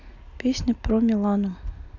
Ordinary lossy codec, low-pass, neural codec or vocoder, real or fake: Opus, 64 kbps; 7.2 kHz; none; real